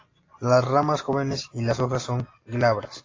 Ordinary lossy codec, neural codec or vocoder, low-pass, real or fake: AAC, 32 kbps; none; 7.2 kHz; real